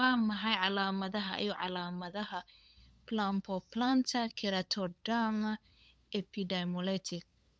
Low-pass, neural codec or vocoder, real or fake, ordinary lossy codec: none; codec, 16 kHz, 8 kbps, FunCodec, trained on LibriTTS, 25 frames a second; fake; none